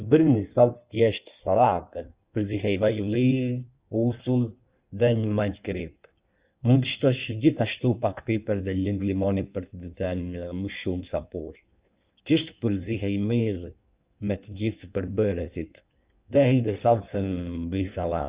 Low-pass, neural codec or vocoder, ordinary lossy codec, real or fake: 3.6 kHz; codec, 16 kHz in and 24 kHz out, 1.1 kbps, FireRedTTS-2 codec; Opus, 64 kbps; fake